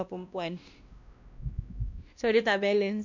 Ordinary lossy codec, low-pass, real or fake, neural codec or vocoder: none; 7.2 kHz; fake; codec, 16 kHz, 1 kbps, X-Codec, WavLM features, trained on Multilingual LibriSpeech